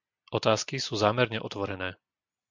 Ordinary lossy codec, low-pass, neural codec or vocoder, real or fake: MP3, 64 kbps; 7.2 kHz; none; real